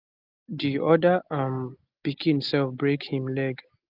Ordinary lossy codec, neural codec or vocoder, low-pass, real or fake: Opus, 32 kbps; none; 5.4 kHz; real